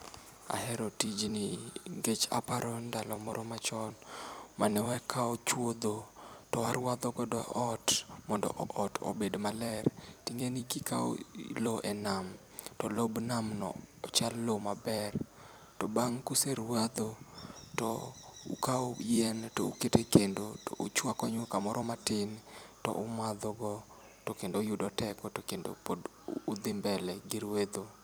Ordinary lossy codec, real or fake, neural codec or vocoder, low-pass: none; fake; vocoder, 44.1 kHz, 128 mel bands every 256 samples, BigVGAN v2; none